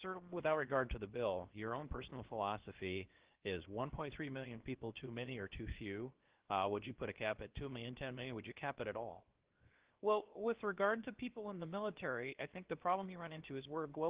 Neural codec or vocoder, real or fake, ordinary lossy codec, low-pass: codec, 16 kHz, 0.7 kbps, FocalCodec; fake; Opus, 16 kbps; 3.6 kHz